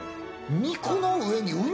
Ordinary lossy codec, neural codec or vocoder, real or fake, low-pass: none; none; real; none